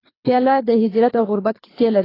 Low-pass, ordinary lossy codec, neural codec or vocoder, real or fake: 5.4 kHz; AAC, 24 kbps; codec, 24 kHz, 3 kbps, HILCodec; fake